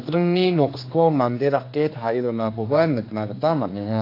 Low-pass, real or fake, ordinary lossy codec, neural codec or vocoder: 5.4 kHz; fake; AAC, 32 kbps; codec, 16 kHz, 2 kbps, X-Codec, HuBERT features, trained on general audio